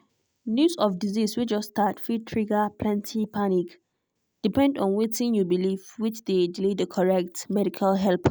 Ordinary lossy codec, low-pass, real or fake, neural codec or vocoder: none; none; real; none